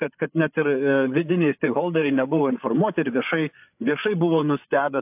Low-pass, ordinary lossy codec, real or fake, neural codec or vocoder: 3.6 kHz; AAC, 32 kbps; fake; codec, 16 kHz, 8 kbps, FreqCodec, larger model